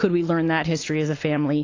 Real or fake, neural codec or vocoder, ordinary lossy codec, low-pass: real; none; AAC, 32 kbps; 7.2 kHz